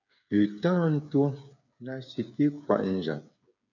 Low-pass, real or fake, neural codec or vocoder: 7.2 kHz; fake; codec, 16 kHz, 8 kbps, FreqCodec, smaller model